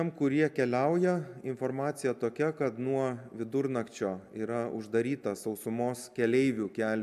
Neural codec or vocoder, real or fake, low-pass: none; real; 14.4 kHz